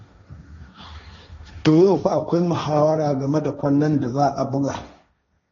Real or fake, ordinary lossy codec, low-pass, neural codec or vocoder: fake; AAC, 32 kbps; 7.2 kHz; codec, 16 kHz, 1.1 kbps, Voila-Tokenizer